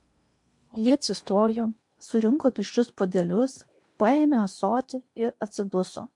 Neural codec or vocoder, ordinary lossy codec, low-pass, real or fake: codec, 16 kHz in and 24 kHz out, 0.8 kbps, FocalCodec, streaming, 65536 codes; MP3, 64 kbps; 10.8 kHz; fake